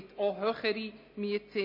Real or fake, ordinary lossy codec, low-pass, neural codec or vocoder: real; MP3, 24 kbps; 5.4 kHz; none